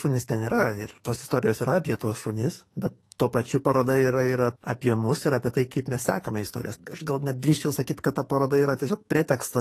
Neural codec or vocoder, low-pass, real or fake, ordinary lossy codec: codec, 44.1 kHz, 2.6 kbps, SNAC; 14.4 kHz; fake; AAC, 48 kbps